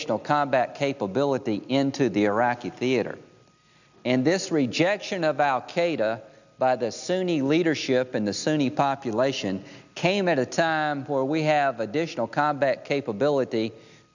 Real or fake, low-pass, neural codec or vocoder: real; 7.2 kHz; none